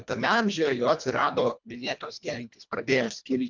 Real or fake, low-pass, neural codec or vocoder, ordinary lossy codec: fake; 7.2 kHz; codec, 24 kHz, 1.5 kbps, HILCodec; MP3, 64 kbps